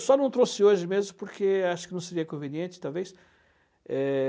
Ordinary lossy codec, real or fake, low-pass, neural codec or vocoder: none; real; none; none